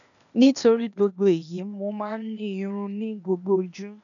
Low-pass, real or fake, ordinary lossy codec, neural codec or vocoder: 7.2 kHz; fake; none; codec, 16 kHz, 0.8 kbps, ZipCodec